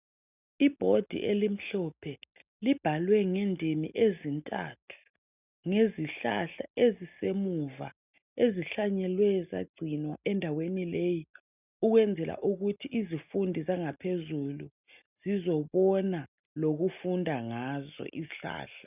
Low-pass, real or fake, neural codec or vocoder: 3.6 kHz; real; none